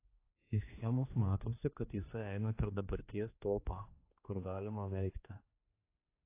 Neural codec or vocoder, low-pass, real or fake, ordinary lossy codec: codec, 16 kHz, 2 kbps, X-Codec, HuBERT features, trained on general audio; 3.6 kHz; fake; AAC, 24 kbps